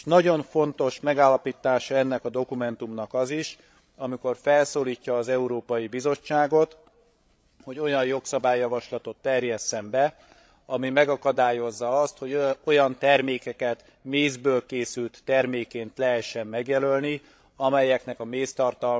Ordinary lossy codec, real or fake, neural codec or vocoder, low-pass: none; fake; codec, 16 kHz, 16 kbps, FreqCodec, larger model; none